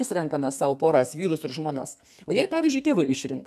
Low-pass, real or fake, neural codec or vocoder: 14.4 kHz; fake; codec, 32 kHz, 1.9 kbps, SNAC